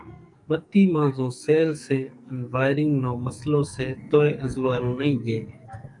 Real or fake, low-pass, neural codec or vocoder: fake; 10.8 kHz; codec, 44.1 kHz, 2.6 kbps, SNAC